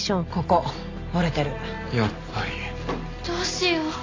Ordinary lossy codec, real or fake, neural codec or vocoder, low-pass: AAC, 32 kbps; real; none; 7.2 kHz